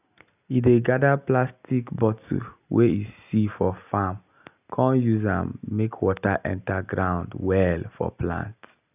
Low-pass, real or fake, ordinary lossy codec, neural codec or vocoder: 3.6 kHz; real; none; none